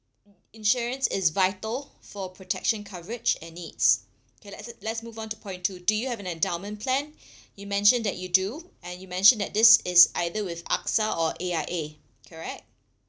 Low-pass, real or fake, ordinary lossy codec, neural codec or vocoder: none; real; none; none